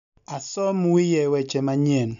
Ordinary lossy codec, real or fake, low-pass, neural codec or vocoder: none; real; 7.2 kHz; none